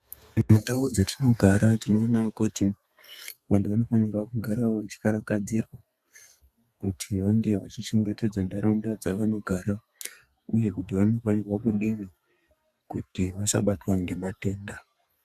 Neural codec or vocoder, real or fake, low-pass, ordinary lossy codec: codec, 32 kHz, 1.9 kbps, SNAC; fake; 14.4 kHz; Opus, 64 kbps